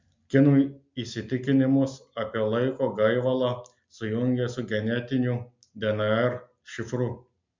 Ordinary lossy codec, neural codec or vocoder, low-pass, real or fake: MP3, 64 kbps; none; 7.2 kHz; real